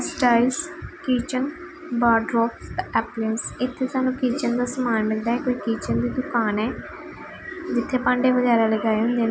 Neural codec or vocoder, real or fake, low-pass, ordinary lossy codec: none; real; none; none